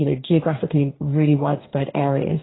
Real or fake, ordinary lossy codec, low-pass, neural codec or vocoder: fake; AAC, 16 kbps; 7.2 kHz; codec, 44.1 kHz, 2.6 kbps, DAC